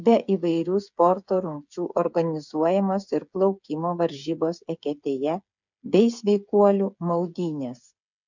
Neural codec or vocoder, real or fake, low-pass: codec, 16 kHz, 8 kbps, FreqCodec, smaller model; fake; 7.2 kHz